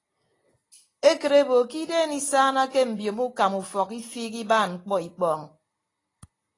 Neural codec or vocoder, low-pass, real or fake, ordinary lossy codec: none; 10.8 kHz; real; AAC, 32 kbps